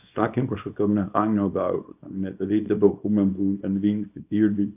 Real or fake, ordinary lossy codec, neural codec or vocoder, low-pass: fake; AAC, 32 kbps; codec, 24 kHz, 0.9 kbps, WavTokenizer, small release; 3.6 kHz